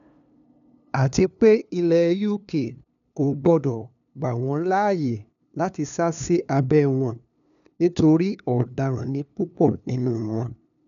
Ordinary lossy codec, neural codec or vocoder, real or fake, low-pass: none; codec, 16 kHz, 2 kbps, FunCodec, trained on LibriTTS, 25 frames a second; fake; 7.2 kHz